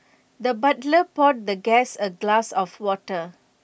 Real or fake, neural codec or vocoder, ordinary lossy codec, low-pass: real; none; none; none